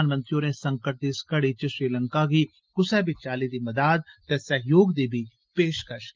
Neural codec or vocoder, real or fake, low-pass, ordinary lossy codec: none; real; 7.2 kHz; Opus, 32 kbps